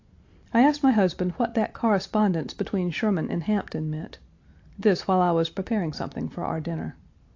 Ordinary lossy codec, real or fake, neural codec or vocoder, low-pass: AAC, 48 kbps; real; none; 7.2 kHz